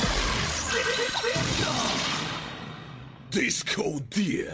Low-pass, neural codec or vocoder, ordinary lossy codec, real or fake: none; codec, 16 kHz, 16 kbps, FreqCodec, larger model; none; fake